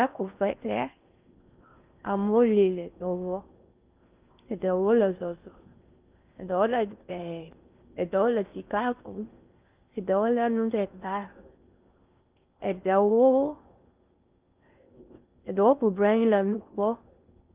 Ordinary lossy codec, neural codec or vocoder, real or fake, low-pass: Opus, 24 kbps; codec, 16 kHz in and 24 kHz out, 0.6 kbps, FocalCodec, streaming, 4096 codes; fake; 3.6 kHz